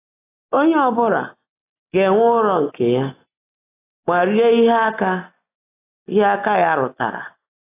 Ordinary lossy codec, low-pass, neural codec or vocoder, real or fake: AAC, 16 kbps; 3.6 kHz; none; real